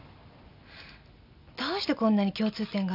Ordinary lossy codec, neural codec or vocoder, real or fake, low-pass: none; none; real; 5.4 kHz